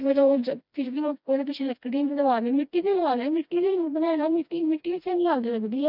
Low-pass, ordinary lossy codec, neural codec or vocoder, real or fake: 5.4 kHz; none; codec, 16 kHz, 1 kbps, FreqCodec, smaller model; fake